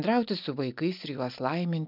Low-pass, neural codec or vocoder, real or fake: 5.4 kHz; none; real